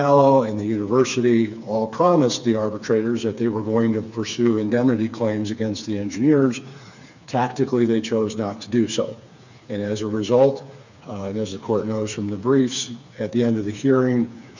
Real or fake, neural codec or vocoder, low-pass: fake; codec, 16 kHz, 4 kbps, FreqCodec, smaller model; 7.2 kHz